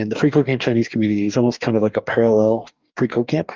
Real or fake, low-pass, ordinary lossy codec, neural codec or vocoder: fake; 7.2 kHz; Opus, 32 kbps; codec, 44.1 kHz, 2.6 kbps, DAC